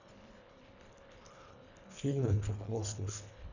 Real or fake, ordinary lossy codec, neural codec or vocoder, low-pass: fake; none; codec, 24 kHz, 1.5 kbps, HILCodec; 7.2 kHz